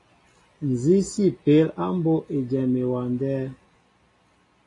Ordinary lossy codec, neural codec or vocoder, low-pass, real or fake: AAC, 32 kbps; none; 10.8 kHz; real